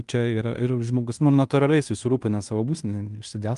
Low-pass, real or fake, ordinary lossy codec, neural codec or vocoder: 10.8 kHz; fake; Opus, 32 kbps; codec, 16 kHz in and 24 kHz out, 0.9 kbps, LongCat-Audio-Codec, fine tuned four codebook decoder